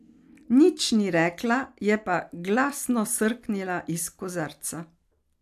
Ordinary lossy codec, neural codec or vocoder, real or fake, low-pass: AAC, 96 kbps; none; real; 14.4 kHz